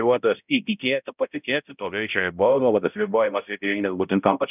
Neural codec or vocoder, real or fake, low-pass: codec, 16 kHz, 0.5 kbps, X-Codec, HuBERT features, trained on balanced general audio; fake; 3.6 kHz